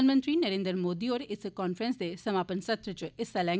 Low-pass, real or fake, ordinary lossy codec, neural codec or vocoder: none; real; none; none